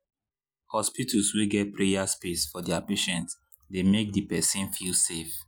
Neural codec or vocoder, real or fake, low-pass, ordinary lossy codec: none; real; none; none